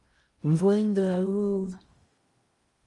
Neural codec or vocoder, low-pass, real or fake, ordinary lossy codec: codec, 16 kHz in and 24 kHz out, 0.6 kbps, FocalCodec, streaming, 2048 codes; 10.8 kHz; fake; Opus, 32 kbps